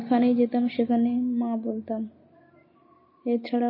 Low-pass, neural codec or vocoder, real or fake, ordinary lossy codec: 5.4 kHz; none; real; MP3, 24 kbps